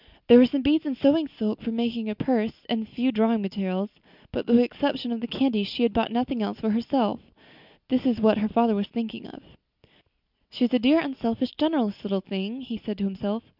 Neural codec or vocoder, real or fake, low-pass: none; real; 5.4 kHz